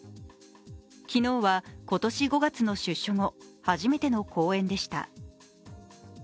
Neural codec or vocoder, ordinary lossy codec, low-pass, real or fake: none; none; none; real